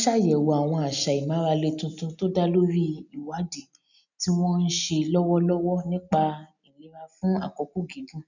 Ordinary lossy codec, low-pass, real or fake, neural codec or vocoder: none; 7.2 kHz; real; none